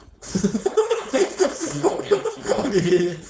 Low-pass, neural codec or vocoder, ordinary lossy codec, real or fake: none; codec, 16 kHz, 4.8 kbps, FACodec; none; fake